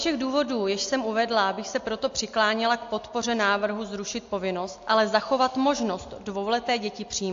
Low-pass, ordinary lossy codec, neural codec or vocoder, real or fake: 7.2 kHz; AAC, 48 kbps; none; real